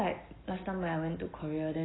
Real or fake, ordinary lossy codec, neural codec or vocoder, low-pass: real; AAC, 16 kbps; none; 7.2 kHz